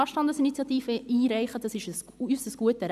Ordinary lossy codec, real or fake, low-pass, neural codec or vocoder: none; real; 14.4 kHz; none